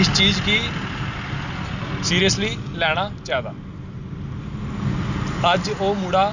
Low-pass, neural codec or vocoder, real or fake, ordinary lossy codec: 7.2 kHz; none; real; none